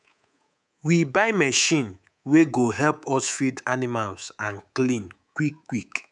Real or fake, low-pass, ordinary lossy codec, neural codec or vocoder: fake; 10.8 kHz; none; codec, 24 kHz, 3.1 kbps, DualCodec